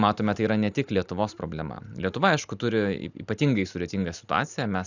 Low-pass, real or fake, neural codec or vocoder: 7.2 kHz; real; none